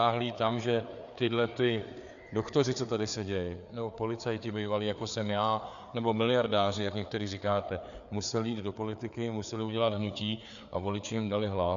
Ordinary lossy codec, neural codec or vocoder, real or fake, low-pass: MP3, 96 kbps; codec, 16 kHz, 4 kbps, FreqCodec, larger model; fake; 7.2 kHz